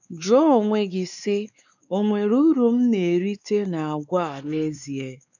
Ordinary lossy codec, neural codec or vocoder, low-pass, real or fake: none; codec, 16 kHz, 4 kbps, X-Codec, WavLM features, trained on Multilingual LibriSpeech; 7.2 kHz; fake